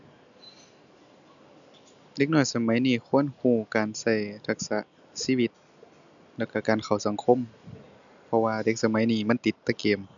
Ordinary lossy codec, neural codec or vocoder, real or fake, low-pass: none; none; real; 7.2 kHz